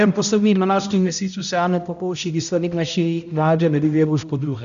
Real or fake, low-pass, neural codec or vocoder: fake; 7.2 kHz; codec, 16 kHz, 0.5 kbps, X-Codec, HuBERT features, trained on balanced general audio